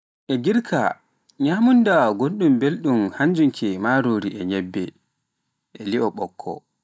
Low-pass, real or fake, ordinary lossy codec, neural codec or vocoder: none; real; none; none